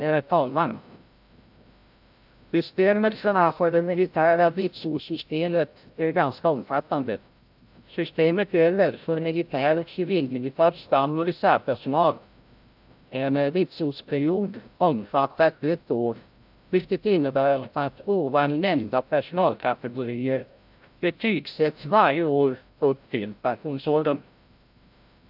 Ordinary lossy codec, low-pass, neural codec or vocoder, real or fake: AAC, 48 kbps; 5.4 kHz; codec, 16 kHz, 0.5 kbps, FreqCodec, larger model; fake